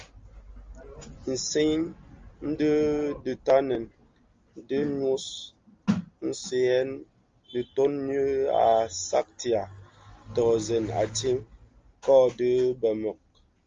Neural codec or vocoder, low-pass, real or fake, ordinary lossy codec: none; 7.2 kHz; real; Opus, 32 kbps